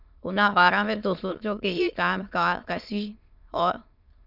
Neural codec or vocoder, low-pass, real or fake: autoencoder, 22.05 kHz, a latent of 192 numbers a frame, VITS, trained on many speakers; 5.4 kHz; fake